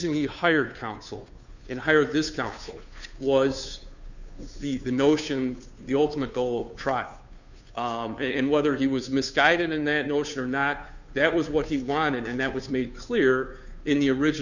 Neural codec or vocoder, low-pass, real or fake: codec, 16 kHz, 2 kbps, FunCodec, trained on Chinese and English, 25 frames a second; 7.2 kHz; fake